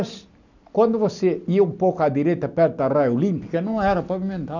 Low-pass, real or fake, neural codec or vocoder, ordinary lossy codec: 7.2 kHz; real; none; none